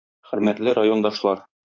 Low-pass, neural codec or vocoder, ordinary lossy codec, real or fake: 7.2 kHz; codec, 16 kHz in and 24 kHz out, 2.2 kbps, FireRedTTS-2 codec; MP3, 64 kbps; fake